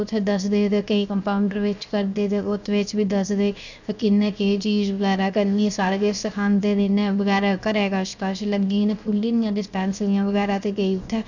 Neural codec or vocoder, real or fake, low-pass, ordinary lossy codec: codec, 16 kHz, 0.7 kbps, FocalCodec; fake; 7.2 kHz; none